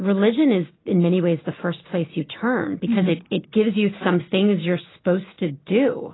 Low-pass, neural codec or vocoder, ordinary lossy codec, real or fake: 7.2 kHz; none; AAC, 16 kbps; real